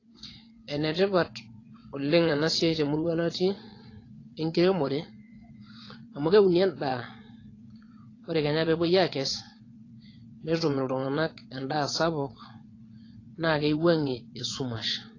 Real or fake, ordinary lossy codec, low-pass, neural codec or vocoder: fake; AAC, 32 kbps; 7.2 kHz; vocoder, 22.05 kHz, 80 mel bands, WaveNeXt